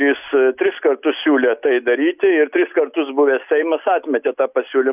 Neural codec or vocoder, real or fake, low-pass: none; real; 3.6 kHz